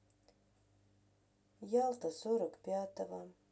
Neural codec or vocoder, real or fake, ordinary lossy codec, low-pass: none; real; none; none